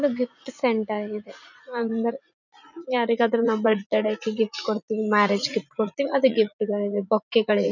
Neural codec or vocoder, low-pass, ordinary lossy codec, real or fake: vocoder, 44.1 kHz, 80 mel bands, Vocos; 7.2 kHz; none; fake